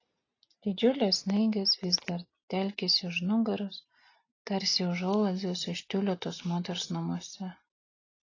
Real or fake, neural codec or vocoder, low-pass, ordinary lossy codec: real; none; 7.2 kHz; AAC, 32 kbps